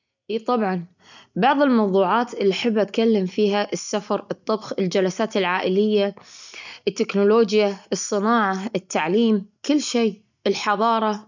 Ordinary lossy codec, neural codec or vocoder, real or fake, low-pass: none; none; real; 7.2 kHz